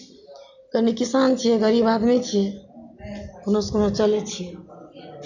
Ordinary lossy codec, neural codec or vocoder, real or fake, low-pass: none; none; real; 7.2 kHz